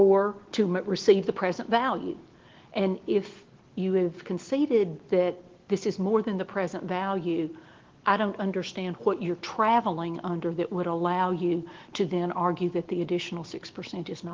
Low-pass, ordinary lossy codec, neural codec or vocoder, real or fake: 7.2 kHz; Opus, 16 kbps; none; real